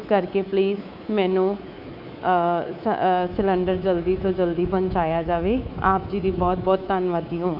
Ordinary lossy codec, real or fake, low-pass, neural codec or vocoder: none; fake; 5.4 kHz; codec, 24 kHz, 3.1 kbps, DualCodec